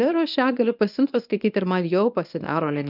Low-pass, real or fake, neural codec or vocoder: 5.4 kHz; fake; codec, 24 kHz, 0.9 kbps, WavTokenizer, medium speech release version 1